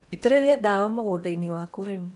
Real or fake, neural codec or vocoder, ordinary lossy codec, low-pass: fake; codec, 16 kHz in and 24 kHz out, 0.8 kbps, FocalCodec, streaming, 65536 codes; none; 10.8 kHz